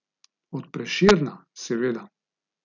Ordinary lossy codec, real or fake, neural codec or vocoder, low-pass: none; real; none; 7.2 kHz